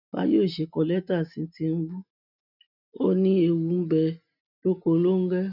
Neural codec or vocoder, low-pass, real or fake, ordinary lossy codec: none; 5.4 kHz; real; none